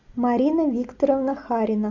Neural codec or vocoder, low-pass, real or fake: none; 7.2 kHz; real